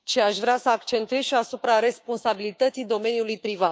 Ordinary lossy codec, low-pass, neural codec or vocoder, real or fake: none; none; codec, 16 kHz, 6 kbps, DAC; fake